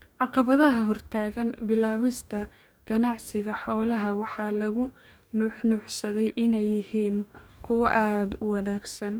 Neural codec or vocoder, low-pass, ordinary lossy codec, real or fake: codec, 44.1 kHz, 2.6 kbps, DAC; none; none; fake